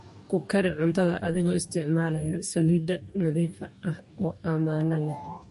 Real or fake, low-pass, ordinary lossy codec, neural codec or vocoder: fake; 14.4 kHz; MP3, 48 kbps; codec, 44.1 kHz, 2.6 kbps, DAC